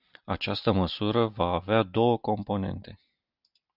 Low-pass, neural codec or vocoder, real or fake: 5.4 kHz; none; real